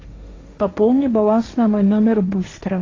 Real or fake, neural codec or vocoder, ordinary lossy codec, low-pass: fake; codec, 16 kHz, 1.1 kbps, Voila-Tokenizer; AAC, 32 kbps; 7.2 kHz